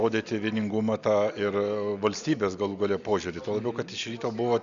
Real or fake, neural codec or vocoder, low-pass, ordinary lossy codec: real; none; 7.2 kHz; Opus, 64 kbps